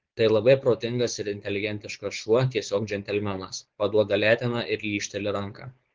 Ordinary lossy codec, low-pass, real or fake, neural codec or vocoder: Opus, 16 kbps; 7.2 kHz; fake; codec, 16 kHz, 4.8 kbps, FACodec